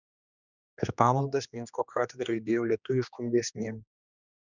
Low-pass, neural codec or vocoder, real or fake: 7.2 kHz; codec, 16 kHz, 2 kbps, X-Codec, HuBERT features, trained on general audio; fake